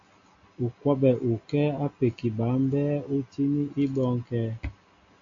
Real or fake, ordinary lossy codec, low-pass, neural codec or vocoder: real; AAC, 64 kbps; 7.2 kHz; none